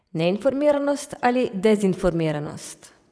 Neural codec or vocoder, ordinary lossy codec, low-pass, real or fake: vocoder, 22.05 kHz, 80 mel bands, WaveNeXt; none; none; fake